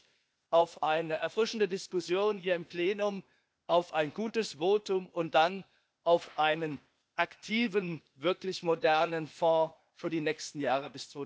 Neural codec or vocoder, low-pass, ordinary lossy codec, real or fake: codec, 16 kHz, 0.8 kbps, ZipCodec; none; none; fake